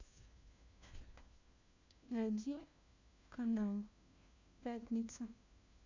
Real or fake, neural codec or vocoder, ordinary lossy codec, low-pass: fake; codec, 16 kHz, 1 kbps, FunCodec, trained on LibriTTS, 50 frames a second; MP3, 64 kbps; 7.2 kHz